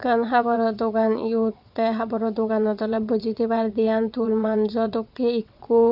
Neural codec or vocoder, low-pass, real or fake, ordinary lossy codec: vocoder, 22.05 kHz, 80 mel bands, WaveNeXt; 5.4 kHz; fake; none